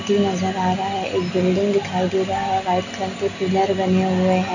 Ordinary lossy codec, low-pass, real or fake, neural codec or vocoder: none; 7.2 kHz; real; none